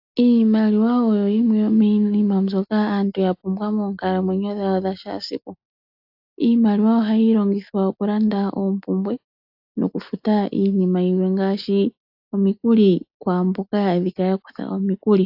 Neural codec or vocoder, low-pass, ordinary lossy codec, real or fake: none; 5.4 kHz; AAC, 48 kbps; real